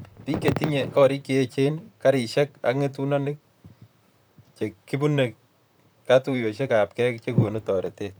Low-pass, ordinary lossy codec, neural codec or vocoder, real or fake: none; none; vocoder, 44.1 kHz, 128 mel bands, Pupu-Vocoder; fake